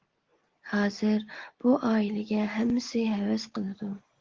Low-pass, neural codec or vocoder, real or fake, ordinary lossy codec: 7.2 kHz; none; real; Opus, 16 kbps